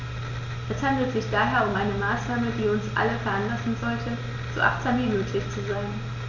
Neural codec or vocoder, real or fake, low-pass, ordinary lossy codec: none; real; 7.2 kHz; none